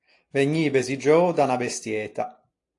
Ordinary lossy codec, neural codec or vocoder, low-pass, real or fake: AAC, 48 kbps; none; 10.8 kHz; real